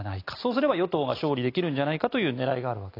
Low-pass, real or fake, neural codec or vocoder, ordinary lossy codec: 5.4 kHz; real; none; AAC, 32 kbps